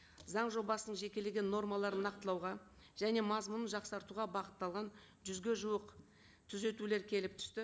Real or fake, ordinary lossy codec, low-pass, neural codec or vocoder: real; none; none; none